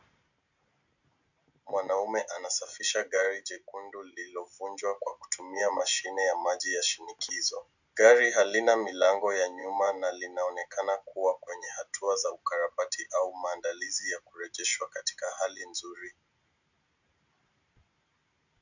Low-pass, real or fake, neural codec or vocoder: 7.2 kHz; real; none